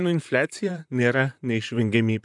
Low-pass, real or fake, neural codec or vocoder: 10.8 kHz; fake; vocoder, 44.1 kHz, 128 mel bands, Pupu-Vocoder